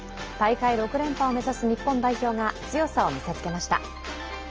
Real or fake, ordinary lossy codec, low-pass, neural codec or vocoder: real; Opus, 24 kbps; 7.2 kHz; none